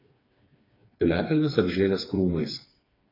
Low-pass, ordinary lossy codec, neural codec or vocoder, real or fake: 5.4 kHz; AAC, 24 kbps; codec, 16 kHz, 4 kbps, FreqCodec, smaller model; fake